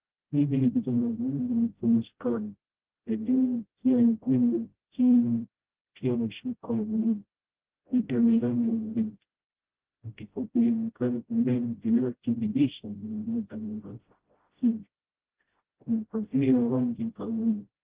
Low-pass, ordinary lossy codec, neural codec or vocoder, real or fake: 3.6 kHz; Opus, 16 kbps; codec, 16 kHz, 0.5 kbps, FreqCodec, smaller model; fake